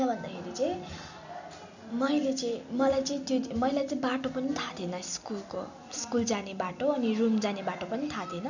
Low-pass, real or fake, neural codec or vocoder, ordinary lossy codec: 7.2 kHz; real; none; none